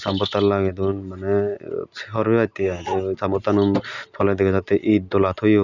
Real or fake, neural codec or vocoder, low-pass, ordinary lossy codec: real; none; 7.2 kHz; none